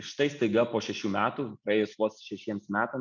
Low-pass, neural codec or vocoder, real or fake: 7.2 kHz; none; real